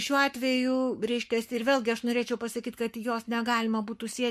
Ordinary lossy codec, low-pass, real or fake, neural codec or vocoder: MP3, 64 kbps; 14.4 kHz; fake; codec, 44.1 kHz, 7.8 kbps, Pupu-Codec